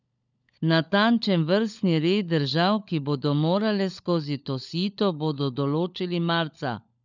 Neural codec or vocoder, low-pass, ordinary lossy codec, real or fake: codec, 16 kHz, 16 kbps, FunCodec, trained on LibriTTS, 50 frames a second; 7.2 kHz; none; fake